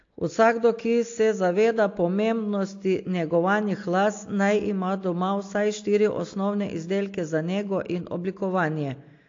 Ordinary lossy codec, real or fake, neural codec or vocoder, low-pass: AAC, 48 kbps; real; none; 7.2 kHz